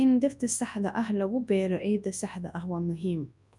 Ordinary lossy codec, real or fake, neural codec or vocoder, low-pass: none; fake; codec, 24 kHz, 0.9 kbps, WavTokenizer, large speech release; 10.8 kHz